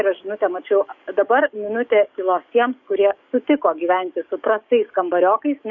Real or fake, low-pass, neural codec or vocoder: fake; 7.2 kHz; codec, 44.1 kHz, 7.8 kbps, DAC